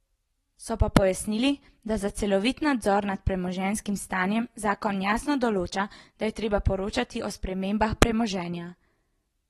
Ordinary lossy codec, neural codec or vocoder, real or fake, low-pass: AAC, 32 kbps; none; real; 19.8 kHz